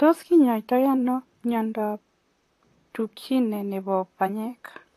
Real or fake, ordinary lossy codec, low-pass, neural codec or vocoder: fake; AAC, 48 kbps; 14.4 kHz; vocoder, 44.1 kHz, 128 mel bands, Pupu-Vocoder